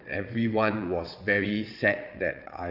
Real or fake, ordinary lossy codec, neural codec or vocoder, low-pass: fake; AAC, 48 kbps; vocoder, 22.05 kHz, 80 mel bands, WaveNeXt; 5.4 kHz